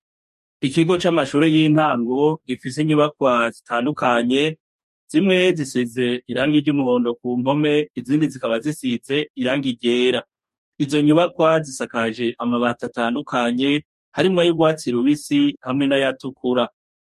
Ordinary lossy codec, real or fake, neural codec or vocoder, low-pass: MP3, 64 kbps; fake; codec, 44.1 kHz, 2.6 kbps, DAC; 14.4 kHz